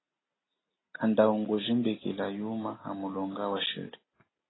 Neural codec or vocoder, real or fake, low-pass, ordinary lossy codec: none; real; 7.2 kHz; AAC, 16 kbps